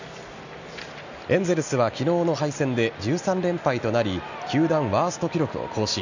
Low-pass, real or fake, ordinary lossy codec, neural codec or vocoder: 7.2 kHz; real; none; none